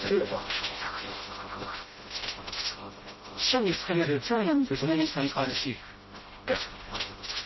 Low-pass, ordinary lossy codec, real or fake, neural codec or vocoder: 7.2 kHz; MP3, 24 kbps; fake; codec, 16 kHz, 0.5 kbps, FreqCodec, smaller model